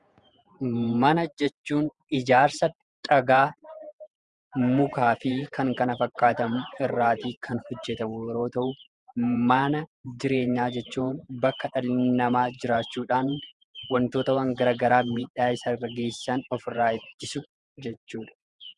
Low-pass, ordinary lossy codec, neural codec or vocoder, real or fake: 10.8 kHz; Opus, 64 kbps; vocoder, 48 kHz, 128 mel bands, Vocos; fake